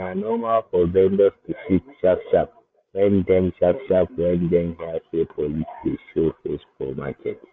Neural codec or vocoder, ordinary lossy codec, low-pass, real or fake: codec, 16 kHz, 8 kbps, FreqCodec, larger model; none; none; fake